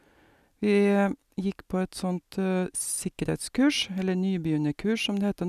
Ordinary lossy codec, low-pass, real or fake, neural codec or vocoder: none; 14.4 kHz; real; none